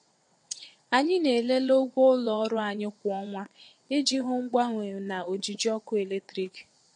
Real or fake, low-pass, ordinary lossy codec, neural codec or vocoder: fake; 9.9 kHz; MP3, 48 kbps; vocoder, 22.05 kHz, 80 mel bands, WaveNeXt